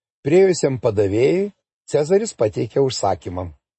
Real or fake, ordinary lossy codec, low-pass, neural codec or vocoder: real; MP3, 32 kbps; 10.8 kHz; none